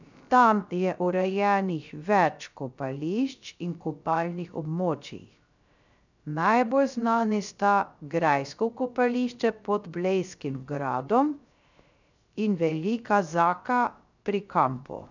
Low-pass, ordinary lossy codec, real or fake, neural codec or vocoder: 7.2 kHz; none; fake; codec, 16 kHz, 0.3 kbps, FocalCodec